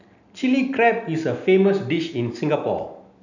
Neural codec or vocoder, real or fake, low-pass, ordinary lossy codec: none; real; 7.2 kHz; none